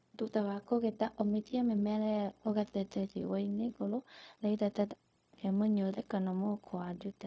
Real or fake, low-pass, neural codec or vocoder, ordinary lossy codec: fake; none; codec, 16 kHz, 0.4 kbps, LongCat-Audio-Codec; none